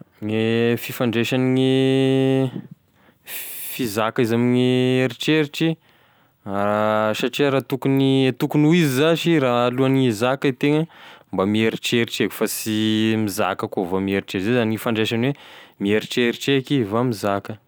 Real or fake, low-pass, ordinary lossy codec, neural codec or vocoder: real; none; none; none